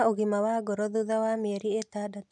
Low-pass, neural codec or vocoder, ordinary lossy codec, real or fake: 10.8 kHz; none; none; real